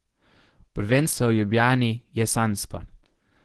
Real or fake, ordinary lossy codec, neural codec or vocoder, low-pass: fake; Opus, 16 kbps; codec, 24 kHz, 0.9 kbps, WavTokenizer, medium speech release version 1; 10.8 kHz